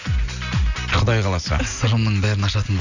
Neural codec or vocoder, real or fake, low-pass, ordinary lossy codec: none; real; 7.2 kHz; none